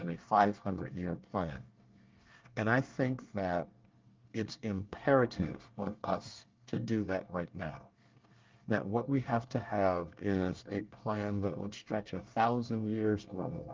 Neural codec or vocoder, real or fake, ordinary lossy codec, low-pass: codec, 24 kHz, 1 kbps, SNAC; fake; Opus, 32 kbps; 7.2 kHz